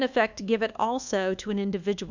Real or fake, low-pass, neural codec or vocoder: fake; 7.2 kHz; codec, 24 kHz, 1.2 kbps, DualCodec